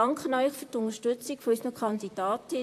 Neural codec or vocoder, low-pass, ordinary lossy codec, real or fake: vocoder, 44.1 kHz, 128 mel bands, Pupu-Vocoder; 14.4 kHz; AAC, 64 kbps; fake